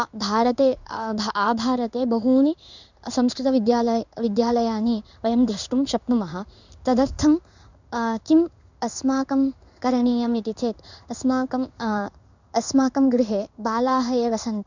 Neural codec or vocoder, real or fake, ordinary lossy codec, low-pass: codec, 16 kHz in and 24 kHz out, 1 kbps, XY-Tokenizer; fake; none; 7.2 kHz